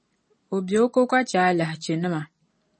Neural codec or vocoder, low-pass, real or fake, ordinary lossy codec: none; 10.8 kHz; real; MP3, 32 kbps